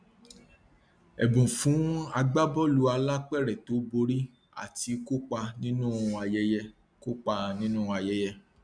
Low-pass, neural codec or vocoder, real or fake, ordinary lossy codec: 9.9 kHz; none; real; none